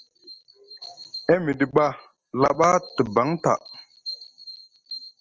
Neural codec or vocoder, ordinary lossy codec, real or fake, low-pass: none; Opus, 24 kbps; real; 7.2 kHz